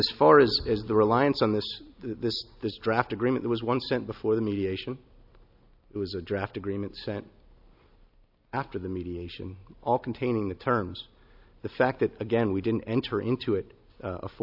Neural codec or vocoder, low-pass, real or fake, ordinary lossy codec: none; 5.4 kHz; real; AAC, 48 kbps